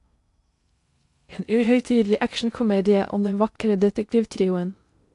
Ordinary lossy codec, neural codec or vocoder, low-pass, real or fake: AAC, 64 kbps; codec, 16 kHz in and 24 kHz out, 0.6 kbps, FocalCodec, streaming, 2048 codes; 10.8 kHz; fake